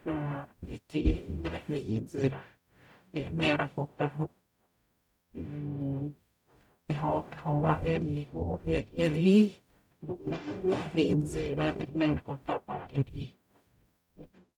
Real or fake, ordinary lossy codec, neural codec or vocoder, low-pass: fake; none; codec, 44.1 kHz, 0.9 kbps, DAC; 19.8 kHz